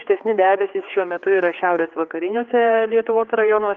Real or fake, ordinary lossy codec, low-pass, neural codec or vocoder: fake; Opus, 32 kbps; 7.2 kHz; codec, 16 kHz, 4 kbps, X-Codec, HuBERT features, trained on general audio